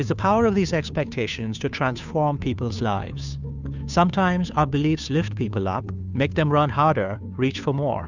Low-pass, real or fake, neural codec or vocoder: 7.2 kHz; fake; codec, 16 kHz, 2 kbps, FunCodec, trained on Chinese and English, 25 frames a second